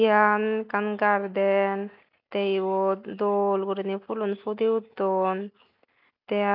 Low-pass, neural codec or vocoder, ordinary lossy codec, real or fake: 5.4 kHz; none; none; real